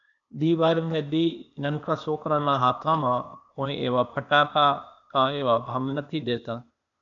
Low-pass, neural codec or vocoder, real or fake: 7.2 kHz; codec, 16 kHz, 0.8 kbps, ZipCodec; fake